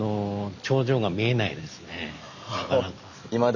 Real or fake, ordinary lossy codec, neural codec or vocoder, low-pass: real; none; none; 7.2 kHz